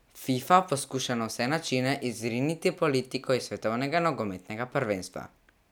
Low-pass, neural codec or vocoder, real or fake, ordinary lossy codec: none; none; real; none